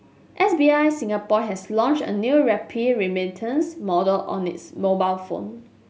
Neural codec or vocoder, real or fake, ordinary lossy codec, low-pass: none; real; none; none